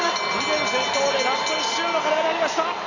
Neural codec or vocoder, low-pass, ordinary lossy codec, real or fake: none; 7.2 kHz; none; real